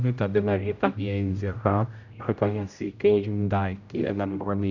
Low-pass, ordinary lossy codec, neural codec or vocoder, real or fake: 7.2 kHz; none; codec, 16 kHz, 0.5 kbps, X-Codec, HuBERT features, trained on general audio; fake